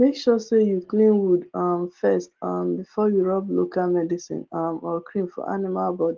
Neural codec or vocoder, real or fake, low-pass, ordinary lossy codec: none; real; 7.2 kHz; Opus, 16 kbps